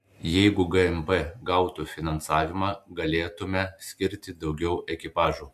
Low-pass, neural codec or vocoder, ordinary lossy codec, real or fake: 14.4 kHz; none; AAC, 96 kbps; real